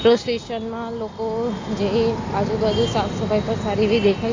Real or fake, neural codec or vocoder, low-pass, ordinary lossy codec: real; none; 7.2 kHz; AAC, 32 kbps